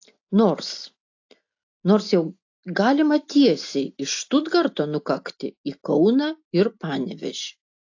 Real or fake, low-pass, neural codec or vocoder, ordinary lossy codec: real; 7.2 kHz; none; AAC, 48 kbps